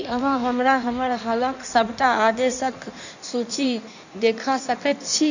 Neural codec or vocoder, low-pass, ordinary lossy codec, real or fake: codec, 16 kHz in and 24 kHz out, 1.1 kbps, FireRedTTS-2 codec; 7.2 kHz; none; fake